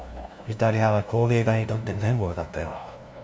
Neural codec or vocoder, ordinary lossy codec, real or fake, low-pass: codec, 16 kHz, 0.5 kbps, FunCodec, trained on LibriTTS, 25 frames a second; none; fake; none